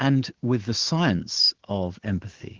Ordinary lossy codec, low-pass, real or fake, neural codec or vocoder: Opus, 16 kbps; 7.2 kHz; fake; codec, 16 kHz, 6 kbps, DAC